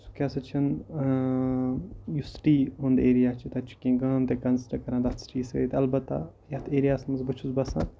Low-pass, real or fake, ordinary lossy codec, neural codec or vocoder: none; real; none; none